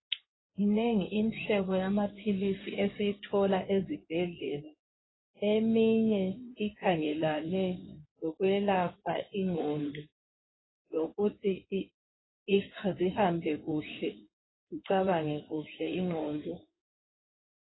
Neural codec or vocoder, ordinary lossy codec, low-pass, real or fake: codec, 16 kHz in and 24 kHz out, 2.2 kbps, FireRedTTS-2 codec; AAC, 16 kbps; 7.2 kHz; fake